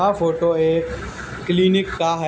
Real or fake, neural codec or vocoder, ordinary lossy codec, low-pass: real; none; none; none